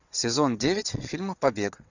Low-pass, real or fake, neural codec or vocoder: 7.2 kHz; fake; vocoder, 22.05 kHz, 80 mel bands, WaveNeXt